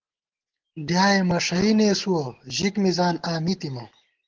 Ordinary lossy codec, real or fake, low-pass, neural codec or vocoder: Opus, 16 kbps; real; 7.2 kHz; none